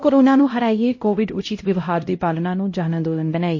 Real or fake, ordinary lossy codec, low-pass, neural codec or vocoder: fake; MP3, 32 kbps; 7.2 kHz; codec, 16 kHz, 0.5 kbps, X-Codec, HuBERT features, trained on LibriSpeech